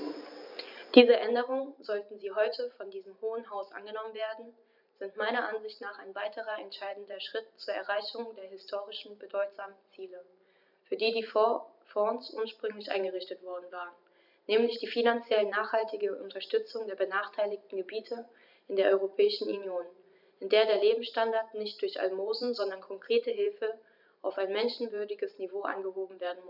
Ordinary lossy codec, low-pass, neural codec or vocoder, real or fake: none; 5.4 kHz; vocoder, 44.1 kHz, 128 mel bands every 512 samples, BigVGAN v2; fake